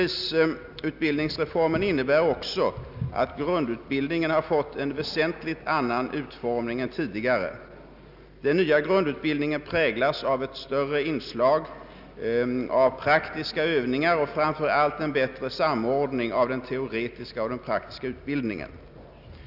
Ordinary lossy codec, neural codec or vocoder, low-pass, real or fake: none; none; 5.4 kHz; real